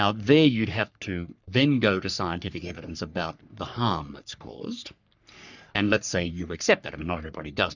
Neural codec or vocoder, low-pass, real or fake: codec, 44.1 kHz, 3.4 kbps, Pupu-Codec; 7.2 kHz; fake